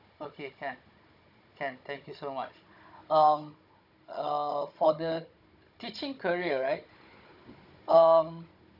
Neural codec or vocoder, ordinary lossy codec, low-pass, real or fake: codec, 16 kHz, 16 kbps, FunCodec, trained on Chinese and English, 50 frames a second; none; 5.4 kHz; fake